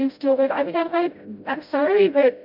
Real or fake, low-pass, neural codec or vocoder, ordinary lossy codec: fake; 5.4 kHz; codec, 16 kHz, 0.5 kbps, FreqCodec, smaller model; MP3, 48 kbps